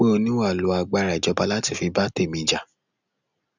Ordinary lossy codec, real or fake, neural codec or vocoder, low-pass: none; real; none; 7.2 kHz